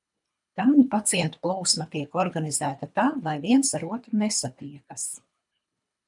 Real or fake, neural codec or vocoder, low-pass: fake; codec, 24 kHz, 3 kbps, HILCodec; 10.8 kHz